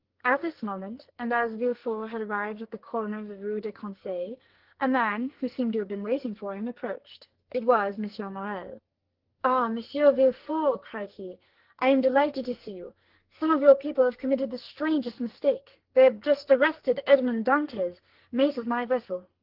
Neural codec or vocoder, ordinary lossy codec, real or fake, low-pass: codec, 44.1 kHz, 2.6 kbps, SNAC; Opus, 16 kbps; fake; 5.4 kHz